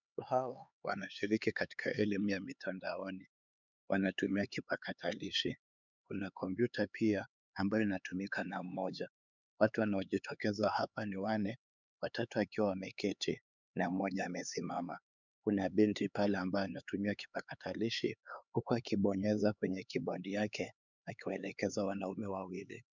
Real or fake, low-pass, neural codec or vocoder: fake; 7.2 kHz; codec, 16 kHz, 4 kbps, X-Codec, HuBERT features, trained on LibriSpeech